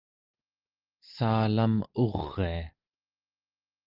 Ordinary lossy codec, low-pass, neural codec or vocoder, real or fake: Opus, 24 kbps; 5.4 kHz; none; real